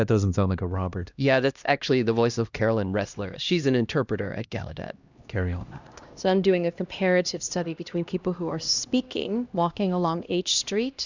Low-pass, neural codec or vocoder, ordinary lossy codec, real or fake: 7.2 kHz; codec, 16 kHz, 1 kbps, X-Codec, HuBERT features, trained on LibriSpeech; Opus, 64 kbps; fake